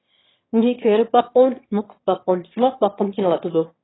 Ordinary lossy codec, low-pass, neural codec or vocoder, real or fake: AAC, 16 kbps; 7.2 kHz; autoencoder, 22.05 kHz, a latent of 192 numbers a frame, VITS, trained on one speaker; fake